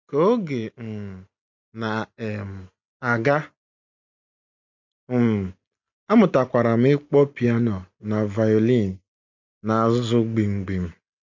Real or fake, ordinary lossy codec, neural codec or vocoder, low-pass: real; MP3, 48 kbps; none; 7.2 kHz